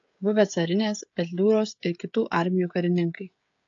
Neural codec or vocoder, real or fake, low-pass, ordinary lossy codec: codec, 16 kHz, 16 kbps, FreqCodec, smaller model; fake; 7.2 kHz; AAC, 48 kbps